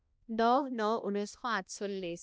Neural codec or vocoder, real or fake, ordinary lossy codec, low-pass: codec, 16 kHz, 1 kbps, X-Codec, HuBERT features, trained on balanced general audio; fake; none; none